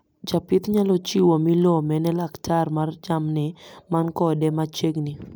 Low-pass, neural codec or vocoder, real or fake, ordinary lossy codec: none; none; real; none